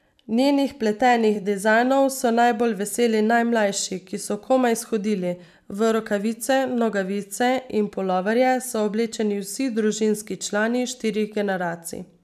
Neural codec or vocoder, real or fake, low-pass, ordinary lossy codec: vocoder, 44.1 kHz, 128 mel bands every 256 samples, BigVGAN v2; fake; 14.4 kHz; none